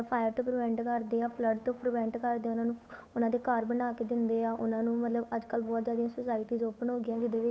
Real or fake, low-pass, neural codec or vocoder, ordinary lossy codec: fake; none; codec, 16 kHz, 8 kbps, FunCodec, trained on Chinese and English, 25 frames a second; none